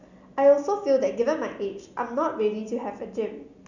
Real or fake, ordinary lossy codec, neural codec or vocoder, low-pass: real; none; none; 7.2 kHz